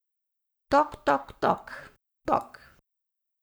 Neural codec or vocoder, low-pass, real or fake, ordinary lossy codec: codec, 44.1 kHz, 7.8 kbps, Pupu-Codec; none; fake; none